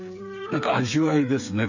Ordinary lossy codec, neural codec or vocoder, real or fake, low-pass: none; codec, 16 kHz, 8 kbps, FreqCodec, smaller model; fake; 7.2 kHz